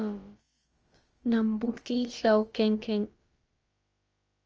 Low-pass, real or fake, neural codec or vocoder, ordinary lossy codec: 7.2 kHz; fake; codec, 16 kHz, about 1 kbps, DyCAST, with the encoder's durations; Opus, 24 kbps